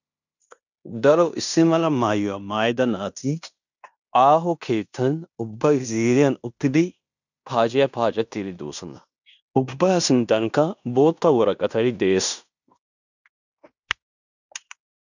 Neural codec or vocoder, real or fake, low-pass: codec, 16 kHz in and 24 kHz out, 0.9 kbps, LongCat-Audio-Codec, fine tuned four codebook decoder; fake; 7.2 kHz